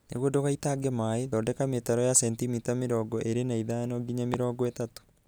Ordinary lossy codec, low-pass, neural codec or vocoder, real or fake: none; none; none; real